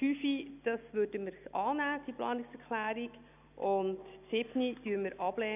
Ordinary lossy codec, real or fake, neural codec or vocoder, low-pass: none; real; none; 3.6 kHz